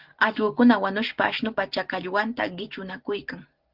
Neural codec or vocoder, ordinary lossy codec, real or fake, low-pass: none; Opus, 16 kbps; real; 5.4 kHz